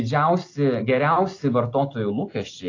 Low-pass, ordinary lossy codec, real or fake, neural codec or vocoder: 7.2 kHz; AAC, 32 kbps; real; none